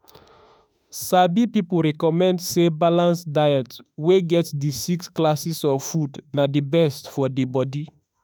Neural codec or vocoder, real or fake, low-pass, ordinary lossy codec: autoencoder, 48 kHz, 32 numbers a frame, DAC-VAE, trained on Japanese speech; fake; none; none